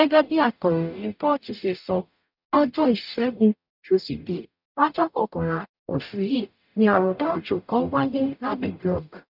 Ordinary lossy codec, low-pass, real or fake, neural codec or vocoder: none; 5.4 kHz; fake; codec, 44.1 kHz, 0.9 kbps, DAC